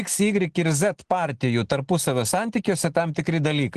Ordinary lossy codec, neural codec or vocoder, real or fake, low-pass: Opus, 16 kbps; none; real; 10.8 kHz